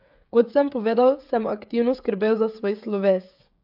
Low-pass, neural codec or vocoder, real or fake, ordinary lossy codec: 5.4 kHz; codec, 16 kHz, 16 kbps, FreqCodec, smaller model; fake; AAC, 48 kbps